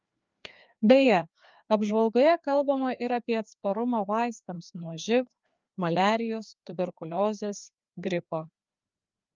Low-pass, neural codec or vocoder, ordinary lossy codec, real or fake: 7.2 kHz; codec, 16 kHz, 2 kbps, FreqCodec, larger model; Opus, 24 kbps; fake